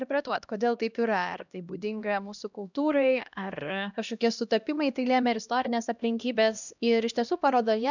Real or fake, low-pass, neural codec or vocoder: fake; 7.2 kHz; codec, 16 kHz, 1 kbps, X-Codec, HuBERT features, trained on LibriSpeech